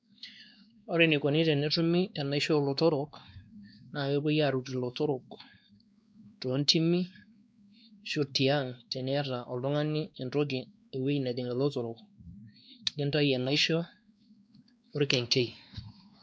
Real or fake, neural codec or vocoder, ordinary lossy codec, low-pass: fake; codec, 16 kHz, 2 kbps, X-Codec, WavLM features, trained on Multilingual LibriSpeech; none; none